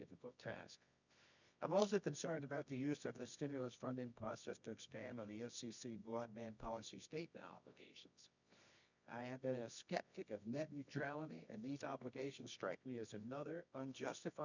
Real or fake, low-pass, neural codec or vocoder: fake; 7.2 kHz; codec, 24 kHz, 0.9 kbps, WavTokenizer, medium music audio release